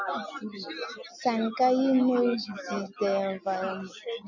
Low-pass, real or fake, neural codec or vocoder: 7.2 kHz; real; none